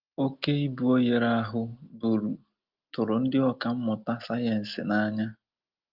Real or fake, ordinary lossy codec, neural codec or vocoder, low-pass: real; Opus, 32 kbps; none; 5.4 kHz